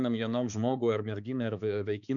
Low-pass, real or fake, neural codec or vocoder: 7.2 kHz; fake; codec, 16 kHz, 4 kbps, X-Codec, HuBERT features, trained on balanced general audio